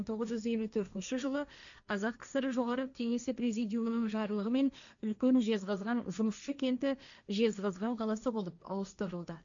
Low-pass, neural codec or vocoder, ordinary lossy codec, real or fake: 7.2 kHz; codec, 16 kHz, 1.1 kbps, Voila-Tokenizer; none; fake